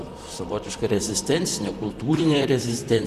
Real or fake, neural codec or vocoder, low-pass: fake; vocoder, 44.1 kHz, 128 mel bands, Pupu-Vocoder; 14.4 kHz